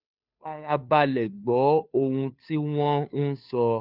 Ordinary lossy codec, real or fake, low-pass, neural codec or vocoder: none; fake; 5.4 kHz; codec, 16 kHz, 2 kbps, FunCodec, trained on Chinese and English, 25 frames a second